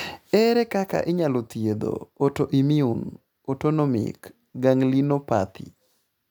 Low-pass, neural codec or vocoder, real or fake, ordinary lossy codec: none; none; real; none